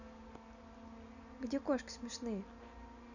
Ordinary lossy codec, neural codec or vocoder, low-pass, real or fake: none; none; 7.2 kHz; real